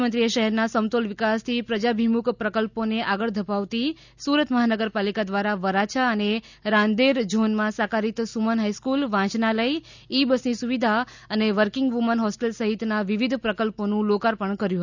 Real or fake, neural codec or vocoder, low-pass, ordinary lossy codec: real; none; 7.2 kHz; none